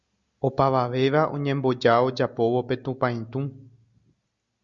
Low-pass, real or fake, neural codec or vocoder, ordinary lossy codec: 7.2 kHz; real; none; Opus, 64 kbps